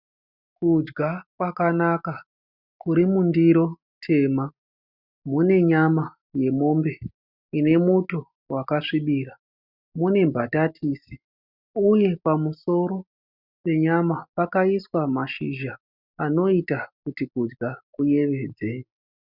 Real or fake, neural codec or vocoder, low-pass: real; none; 5.4 kHz